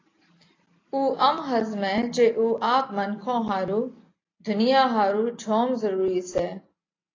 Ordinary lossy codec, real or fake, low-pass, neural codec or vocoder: AAC, 32 kbps; real; 7.2 kHz; none